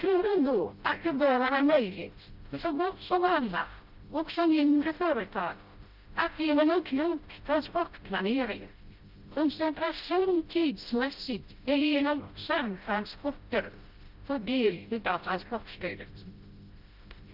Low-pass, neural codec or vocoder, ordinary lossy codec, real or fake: 5.4 kHz; codec, 16 kHz, 0.5 kbps, FreqCodec, smaller model; Opus, 24 kbps; fake